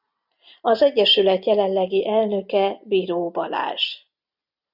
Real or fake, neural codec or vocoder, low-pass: real; none; 5.4 kHz